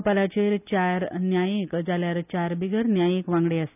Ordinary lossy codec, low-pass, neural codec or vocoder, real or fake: none; 3.6 kHz; none; real